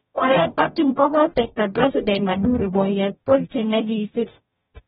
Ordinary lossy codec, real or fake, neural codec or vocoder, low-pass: AAC, 16 kbps; fake; codec, 44.1 kHz, 0.9 kbps, DAC; 19.8 kHz